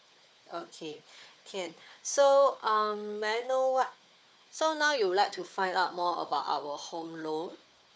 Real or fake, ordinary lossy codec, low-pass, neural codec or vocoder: fake; none; none; codec, 16 kHz, 4 kbps, FunCodec, trained on Chinese and English, 50 frames a second